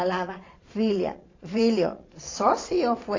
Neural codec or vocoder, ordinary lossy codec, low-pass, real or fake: vocoder, 22.05 kHz, 80 mel bands, Vocos; AAC, 32 kbps; 7.2 kHz; fake